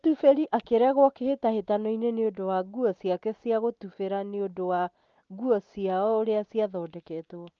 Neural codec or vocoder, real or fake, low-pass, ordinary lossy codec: none; real; 7.2 kHz; Opus, 24 kbps